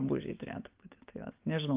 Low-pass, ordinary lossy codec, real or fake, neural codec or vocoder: 3.6 kHz; Opus, 24 kbps; real; none